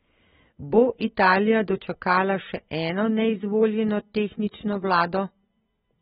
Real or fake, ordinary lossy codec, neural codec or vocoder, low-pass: real; AAC, 16 kbps; none; 7.2 kHz